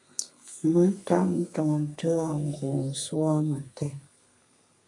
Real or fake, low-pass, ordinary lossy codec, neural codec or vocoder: fake; 10.8 kHz; MP3, 96 kbps; codec, 32 kHz, 1.9 kbps, SNAC